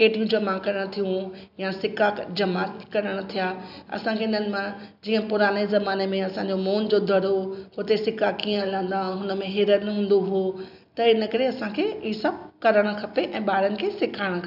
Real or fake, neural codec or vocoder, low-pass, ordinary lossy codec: real; none; 5.4 kHz; none